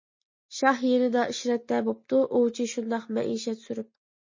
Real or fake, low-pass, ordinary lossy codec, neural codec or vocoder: real; 7.2 kHz; MP3, 32 kbps; none